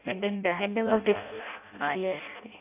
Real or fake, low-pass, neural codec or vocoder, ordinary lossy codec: fake; 3.6 kHz; codec, 16 kHz in and 24 kHz out, 0.6 kbps, FireRedTTS-2 codec; AAC, 32 kbps